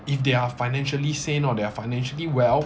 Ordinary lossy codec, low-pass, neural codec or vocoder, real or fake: none; none; none; real